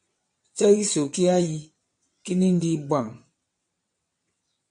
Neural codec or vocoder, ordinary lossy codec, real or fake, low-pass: vocoder, 22.05 kHz, 80 mel bands, WaveNeXt; MP3, 48 kbps; fake; 9.9 kHz